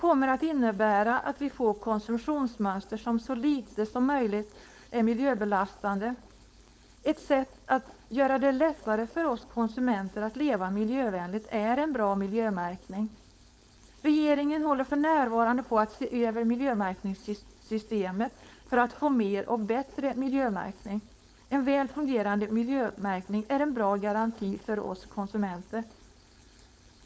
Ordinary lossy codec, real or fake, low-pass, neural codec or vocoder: none; fake; none; codec, 16 kHz, 4.8 kbps, FACodec